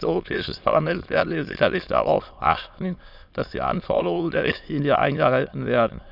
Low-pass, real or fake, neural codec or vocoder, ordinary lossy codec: 5.4 kHz; fake; autoencoder, 22.05 kHz, a latent of 192 numbers a frame, VITS, trained on many speakers; none